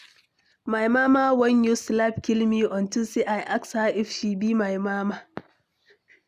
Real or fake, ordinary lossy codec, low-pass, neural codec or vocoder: real; none; 14.4 kHz; none